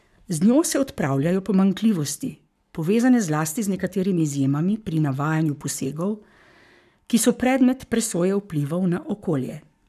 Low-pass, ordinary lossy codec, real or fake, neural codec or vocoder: 14.4 kHz; none; fake; codec, 44.1 kHz, 7.8 kbps, Pupu-Codec